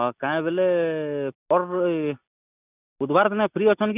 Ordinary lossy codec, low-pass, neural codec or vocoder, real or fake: none; 3.6 kHz; none; real